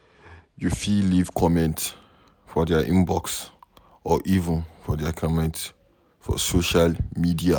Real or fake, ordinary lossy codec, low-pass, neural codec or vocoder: real; none; none; none